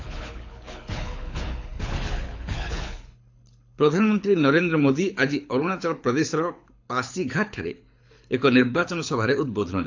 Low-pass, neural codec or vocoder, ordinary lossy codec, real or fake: 7.2 kHz; codec, 24 kHz, 6 kbps, HILCodec; AAC, 48 kbps; fake